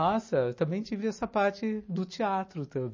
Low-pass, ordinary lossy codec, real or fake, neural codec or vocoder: 7.2 kHz; MP3, 32 kbps; real; none